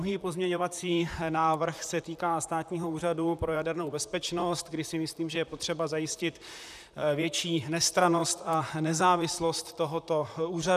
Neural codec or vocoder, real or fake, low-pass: vocoder, 44.1 kHz, 128 mel bands, Pupu-Vocoder; fake; 14.4 kHz